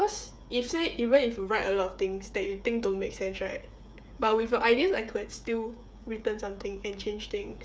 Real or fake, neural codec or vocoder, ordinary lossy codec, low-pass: fake; codec, 16 kHz, 8 kbps, FreqCodec, smaller model; none; none